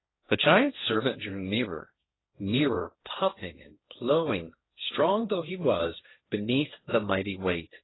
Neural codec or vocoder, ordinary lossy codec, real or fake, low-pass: codec, 16 kHz, 1.1 kbps, Voila-Tokenizer; AAC, 16 kbps; fake; 7.2 kHz